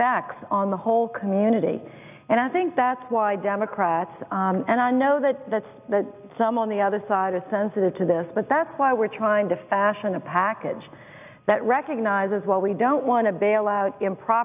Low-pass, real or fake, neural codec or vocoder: 3.6 kHz; real; none